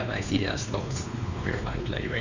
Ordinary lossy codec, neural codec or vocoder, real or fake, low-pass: none; codec, 16 kHz, 2 kbps, X-Codec, WavLM features, trained on Multilingual LibriSpeech; fake; 7.2 kHz